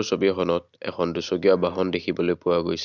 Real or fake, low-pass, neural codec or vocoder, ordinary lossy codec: real; 7.2 kHz; none; none